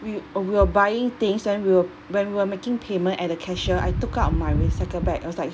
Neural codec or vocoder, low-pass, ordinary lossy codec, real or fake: none; none; none; real